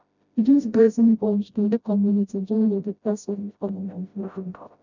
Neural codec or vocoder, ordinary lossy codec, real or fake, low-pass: codec, 16 kHz, 0.5 kbps, FreqCodec, smaller model; none; fake; 7.2 kHz